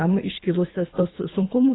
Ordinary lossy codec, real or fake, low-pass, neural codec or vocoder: AAC, 16 kbps; fake; 7.2 kHz; codec, 24 kHz, 3 kbps, HILCodec